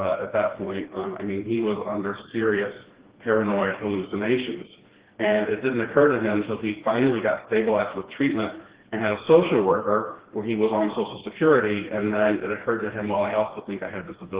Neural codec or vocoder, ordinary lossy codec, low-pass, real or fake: codec, 16 kHz, 2 kbps, FreqCodec, smaller model; Opus, 16 kbps; 3.6 kHz; fake